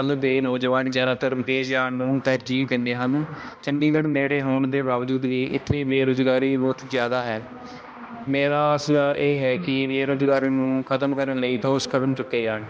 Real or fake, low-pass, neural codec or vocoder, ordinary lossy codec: fake; none; codec, 16 kHz, 1 kbps, X-Codec, HuBERT features, trained on balanced general audio; none